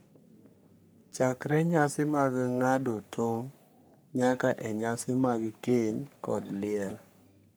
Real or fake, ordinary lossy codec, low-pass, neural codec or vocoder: fake; none; none; codec, 44.1 kHz, 3.4 kbps, Pupu-Codec